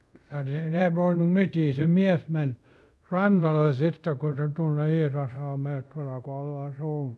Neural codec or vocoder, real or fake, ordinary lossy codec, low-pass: codec, 24 kHz, 0.5 kbps, DualCodec; fake; none; none